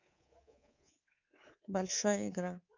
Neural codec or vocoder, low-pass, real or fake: codec, 24 kHz, 3.1 kbps, DualCodec; 7.2 kHz; fake